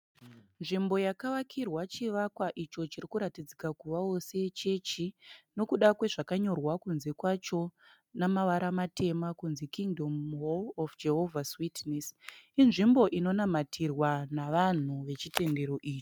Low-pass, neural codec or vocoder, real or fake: 19.8 kHz; none; real